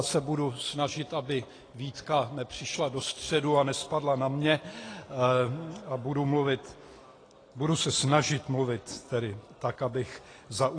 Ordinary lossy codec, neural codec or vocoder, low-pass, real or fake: AAC, 32 kbps; none; 9.9 kHz; real